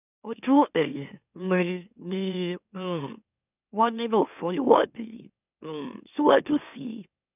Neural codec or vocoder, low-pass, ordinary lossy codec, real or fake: autoencoder, 44.1 kHz, a latent of 192 numbers a frame, MeloTTS; 3.6 kHz; none; fake